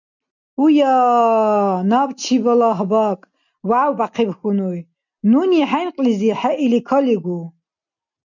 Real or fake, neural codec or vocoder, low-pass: real; none; 7.2 kHz